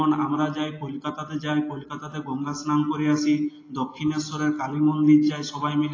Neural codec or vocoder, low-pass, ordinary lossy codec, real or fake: none; 7.2 kHz; AAC, 32 kbps; real